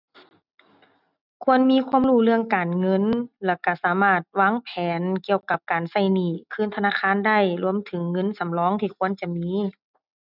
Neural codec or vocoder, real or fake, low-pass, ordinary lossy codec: none; real; 5.4 kHz; none